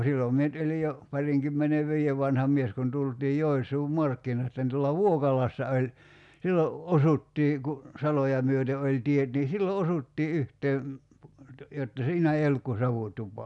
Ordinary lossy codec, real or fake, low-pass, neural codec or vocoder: none; real; 10.8 kHz; none